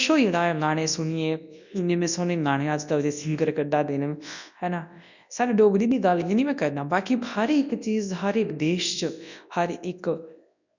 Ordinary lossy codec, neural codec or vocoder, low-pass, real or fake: none; codec, 24 kHz, 0.9 kbps, WavTokenizer, large speech release; 7.2 kHz; fake